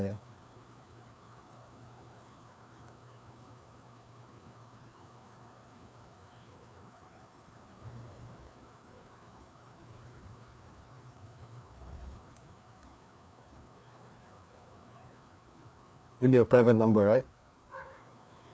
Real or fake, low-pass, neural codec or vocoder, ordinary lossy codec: fake; none; codec, 16 kHz, 2 kbps, FreqCodec, larger model; none